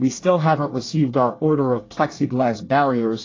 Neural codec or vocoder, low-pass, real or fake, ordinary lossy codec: codec, 24 kHz, 1 kbps, SNAC; 7.2 kHz; fake; AAC, 32 kbps